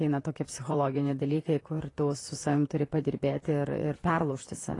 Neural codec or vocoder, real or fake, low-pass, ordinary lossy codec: vocoder, 44.1 kHz, 128 mel bands, Pupu-Vocoder; fake; 10.8 kHz; AAC, 32 kbps